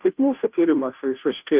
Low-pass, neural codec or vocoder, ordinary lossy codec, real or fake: 3.6 kHz; codec, 16 kHz, 0.5 kbps, FunCodec, trained on Chinese and English, 25 frames a second; Opus, 24 kbps; fake